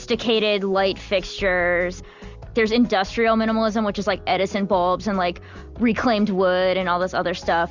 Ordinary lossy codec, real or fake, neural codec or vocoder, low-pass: Opus, 64 kbps; real; none; 7.2 kHz